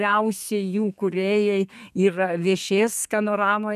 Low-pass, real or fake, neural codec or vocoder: 14.4 kHz; fake; codec, 32 kHz, 1.9 kbps, SNAC